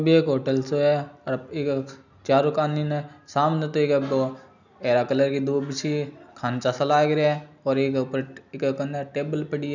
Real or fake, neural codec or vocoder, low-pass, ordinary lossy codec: real; none; 7.2 kHz; none